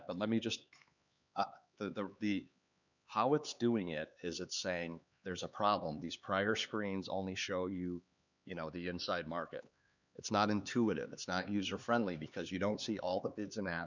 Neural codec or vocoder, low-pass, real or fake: codec, 16 kHz, 4 kbps, X-Codec, HuBERT features, trained on LibriSpeech; 7.2 kHz; fake